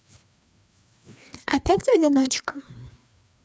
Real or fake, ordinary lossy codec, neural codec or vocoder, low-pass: fake; none; codec, 16 kHz, 2 kbps, FreqCodec, larger model; none